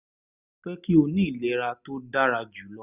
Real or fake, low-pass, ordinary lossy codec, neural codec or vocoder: real; 3.6 kHz; none; none